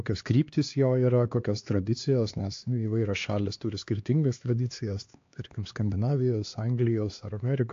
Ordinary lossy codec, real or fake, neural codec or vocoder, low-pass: AAC, 48 kbps; fake; codec, 16 kHz, 2 kbps, X-Codec, HuBERT features, trained on LibriSpeech; 7.2 kHz